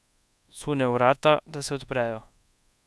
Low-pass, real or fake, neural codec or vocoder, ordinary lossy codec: none; fake; codec, 24 kHz, 1.2 kbps, DualCodec; none